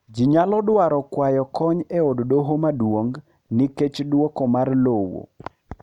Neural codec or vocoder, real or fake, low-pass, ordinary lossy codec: none; real; 19.8 kHz; none